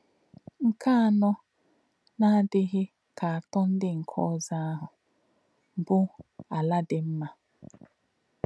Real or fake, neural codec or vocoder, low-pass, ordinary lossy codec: real; none; none; none